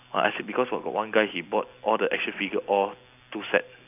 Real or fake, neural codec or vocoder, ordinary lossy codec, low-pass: real; none; none; 3.6 kHz